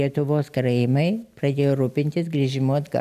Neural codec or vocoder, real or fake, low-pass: none; real; 14.4 kHz